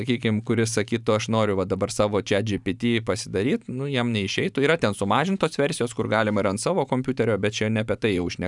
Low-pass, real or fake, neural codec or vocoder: 10.8 kHz; fake; vocoder, 44.1 kHz, 128 mel bands every 512 samples, BigVGAN v2